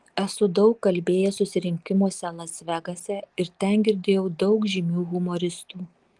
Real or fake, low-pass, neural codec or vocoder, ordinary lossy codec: real; 10.8 kHz; none; Opus, 24 kbps